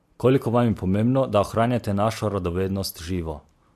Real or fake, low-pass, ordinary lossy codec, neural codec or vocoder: real; 14.4 kHz; MP3, 64 kbps; none